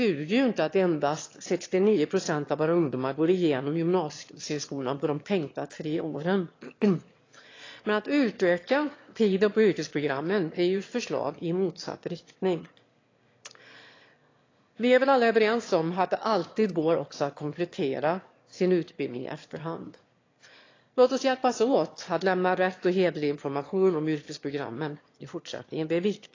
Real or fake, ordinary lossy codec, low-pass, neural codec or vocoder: fake; AAC, 32 kbps; 7.2 kHz; autoencoder, 22.05 kHz, a latent of 192 numbers a frame, VITS, trained on one speaker